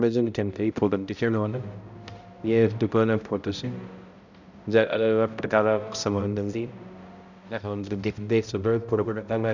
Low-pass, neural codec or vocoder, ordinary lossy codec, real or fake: 7.2 kHz; codec, 16 kHz, 0.5 kbps, X-Codec, HuBERT features, trained on balanced general audio; none; fake